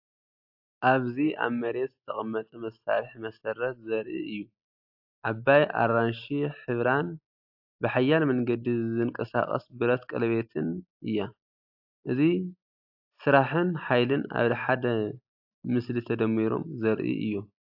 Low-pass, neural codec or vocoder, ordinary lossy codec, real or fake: 5.4 kHz; none; AAC, 48 kbps; real